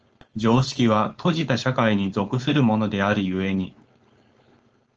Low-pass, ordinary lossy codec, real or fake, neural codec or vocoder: 7.2 kHz; Opus, 24 kbps; fake; codec, 16 kHz, 4.8 kbps, FACodec